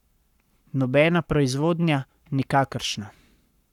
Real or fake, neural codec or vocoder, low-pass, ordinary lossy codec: fake; codec, 44.1 kHz, 7.8 kbps, Pupu-Codec; 19.8 kHz; none